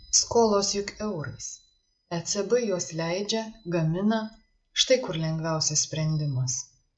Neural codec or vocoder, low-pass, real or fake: none; 9.9 kHz; real